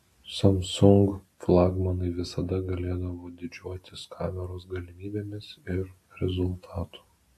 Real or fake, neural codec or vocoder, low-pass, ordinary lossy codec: real; none; 14.4 kHz; AAC, 64 kbps